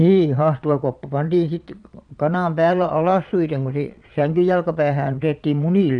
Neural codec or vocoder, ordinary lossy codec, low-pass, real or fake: vocoder, 22.05 kHz, 80 mel bands, Vocos; none; 9.9 kHz; fake